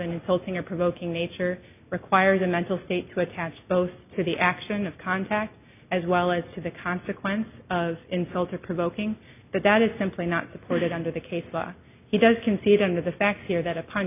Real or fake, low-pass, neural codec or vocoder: real; 3.6 kHz; none